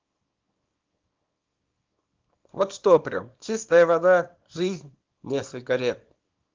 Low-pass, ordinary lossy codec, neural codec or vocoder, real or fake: 7.2 kHz; Opus, 32 kbps; codec, 24 kHz, 0.9 kbps, WavTokenizer, small release; fake